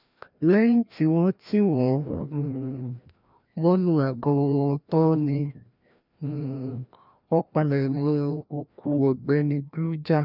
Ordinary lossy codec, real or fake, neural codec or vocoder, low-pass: none; fake; codec, 16 kHz, 1 kbps, FreqCodec, larger model; 5.4 kHz